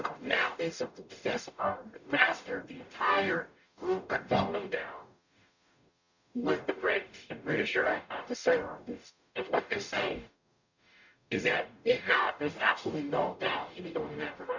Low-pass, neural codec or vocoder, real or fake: 7.2 kHz; codec, 44.1 kHz, 0.9 kbps, DAC; fake